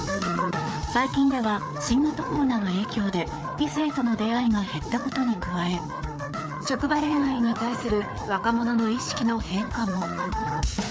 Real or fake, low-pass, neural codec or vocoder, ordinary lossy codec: fake; none; codec, 16 kHz, 4 kbps, FreqCodec, larger model; none